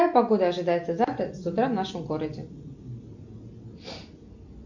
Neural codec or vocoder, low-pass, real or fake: none; 7.2 kHz; real